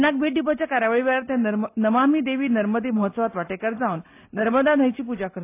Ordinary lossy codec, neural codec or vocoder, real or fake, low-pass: AAC, 24 kbps; none; real; 3.6 kHz